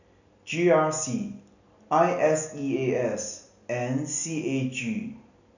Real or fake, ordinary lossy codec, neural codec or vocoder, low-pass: real; none; none; 7.2 kHz